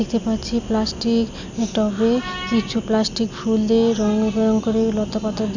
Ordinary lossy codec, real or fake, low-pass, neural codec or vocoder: none; real; 7.2 kHz; none